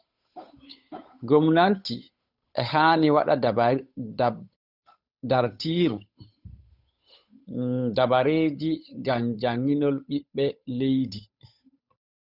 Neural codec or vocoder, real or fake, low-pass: codec, 16 kHz, 8 kbps, FunCodec, trained on Chinese and English, 25 frames a second; fake; 5.4 kHz